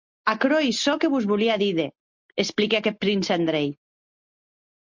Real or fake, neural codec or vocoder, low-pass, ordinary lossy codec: real; none; 7.2 kHz; MP3, 48 kbps